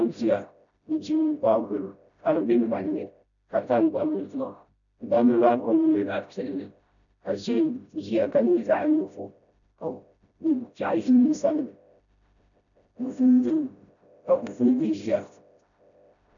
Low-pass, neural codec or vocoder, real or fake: 7.2 kHz; codec, 16 kHz, 0.5 kbps, FreqCodec, smaller model; fake